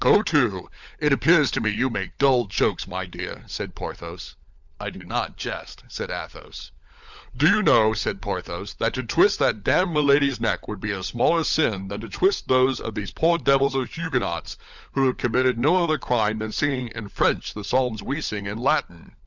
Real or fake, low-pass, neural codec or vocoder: fake; 7.2 kHz; codec, 16 kHz, 16 kbps, FunCodec, trained on LibriTTS, 50 frames a second